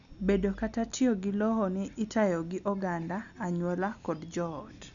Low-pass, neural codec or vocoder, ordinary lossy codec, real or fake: 7.2 kHz; none; none; real